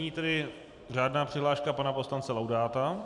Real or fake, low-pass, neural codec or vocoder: fake; 10.8 kHz; vocoder, 44.1 kHz, 128 mel bands every 256 samples, BigVGAN v2